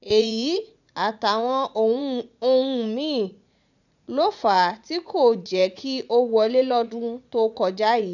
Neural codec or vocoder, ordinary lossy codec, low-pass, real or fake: vocoder, 44.1 kHz, 80 mel bands, Vocos; none; 7.2 kHz; fake